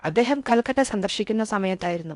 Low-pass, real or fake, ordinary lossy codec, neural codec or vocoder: 10.8 kHz; fake; none; codec, 16 kHz in and 24 kHz out, 0.6 kbps, FocalCodec, streaming, 4096 codes